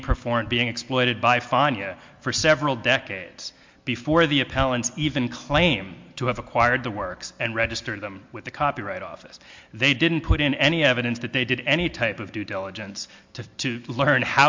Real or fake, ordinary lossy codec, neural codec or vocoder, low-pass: real; MP3, 48 kbps; none; 7.2 kHz